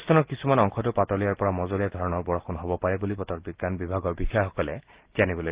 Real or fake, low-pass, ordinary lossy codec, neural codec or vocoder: real; 3.6 kHz; Opus, 16 kbps; none